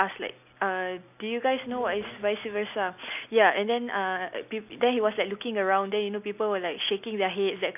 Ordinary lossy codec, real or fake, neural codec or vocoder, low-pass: none; real; none; 3.6 kHz